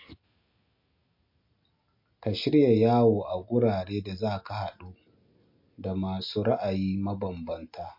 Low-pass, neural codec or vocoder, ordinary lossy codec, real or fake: 5.4 kHz; none; MP3, 32 kbps; real